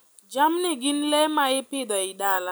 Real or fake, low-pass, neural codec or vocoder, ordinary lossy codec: real; none; none; none